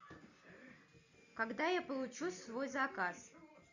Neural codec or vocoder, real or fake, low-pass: none; real; 7.2 kHz